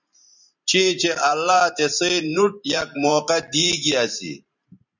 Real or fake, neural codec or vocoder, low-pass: fake; vocoder, 44.1 kHz, 128 mel bands every 512 samples, BigVGAN v2; 7.2 kHz